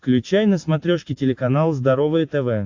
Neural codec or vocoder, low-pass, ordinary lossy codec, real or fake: none; 7.2 kHz; AAC, 48 kbps; real